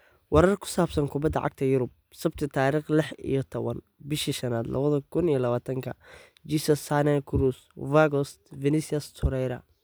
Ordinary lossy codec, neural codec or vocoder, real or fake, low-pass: none; none; real; none